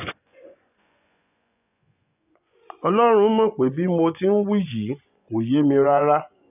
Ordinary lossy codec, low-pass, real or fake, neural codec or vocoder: none; 3.6 kHz; fake; vocoder, 24 kHz, 100 mel bands, Vocos